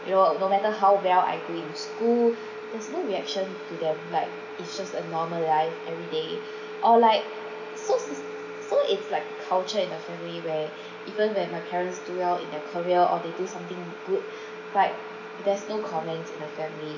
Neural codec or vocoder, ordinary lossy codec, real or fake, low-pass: none; none; real; 7.2 kHz